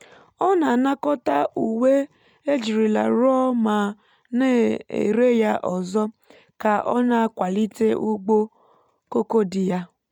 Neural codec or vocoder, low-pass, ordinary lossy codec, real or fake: none; 19.8 kHz; MP3, 96 kbps; real